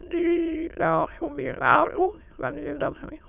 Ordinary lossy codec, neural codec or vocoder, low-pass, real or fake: none; autoencoder, 22.05 kHz, a latent of 192 numbers a frame, VITS, trained on many speakers; 3.6 kHz; fake